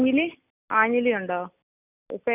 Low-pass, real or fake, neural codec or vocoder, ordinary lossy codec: 3.6 kHz; real; none; none